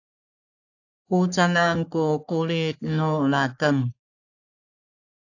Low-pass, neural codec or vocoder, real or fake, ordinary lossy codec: 7.2 kHz; codec, 44.1 kHz, 3.4 kbps, Pupu-Codec; fake; AAC, 48 kbps